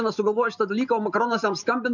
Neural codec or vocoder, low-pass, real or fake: none; 7.2 kHz; real